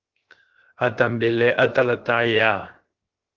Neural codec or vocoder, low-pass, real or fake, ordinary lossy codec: codec, 16 kHz, 0.7 kbps, FocalCodec; 7.2 kHz; fake; Opus, 16 kbps